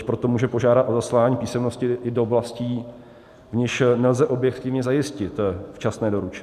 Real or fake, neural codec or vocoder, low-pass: real; none; 14.4 kHz